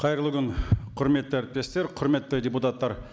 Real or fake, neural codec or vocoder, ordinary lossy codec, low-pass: real; none; none; none